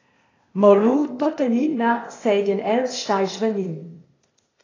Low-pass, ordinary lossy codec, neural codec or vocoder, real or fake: 7.2 kHz; AAC, 32 kbps; codec, 16 kHz, 0.8 kbps, ZipCodec; fake